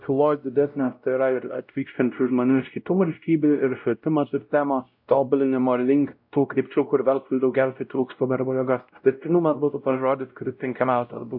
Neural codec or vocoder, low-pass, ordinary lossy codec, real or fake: codec, 16 kHz, 0.5 kbps, X-Codec, WavLM features, trained on Multilingual LibriSpeech; 5.4 kHz; AAC, 48 kbps; fake